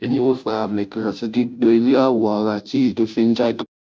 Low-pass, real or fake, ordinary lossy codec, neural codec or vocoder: none; fake; none; codec, 16 kHz, 0.5 kbps, FunCodec, trained on Chinese and English, 25 frames a second